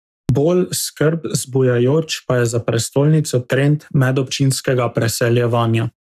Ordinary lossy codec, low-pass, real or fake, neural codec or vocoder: none; 14.4 kHz; fake; codec, 44.1 kHz, 7.8 kbps, Pupu-Codec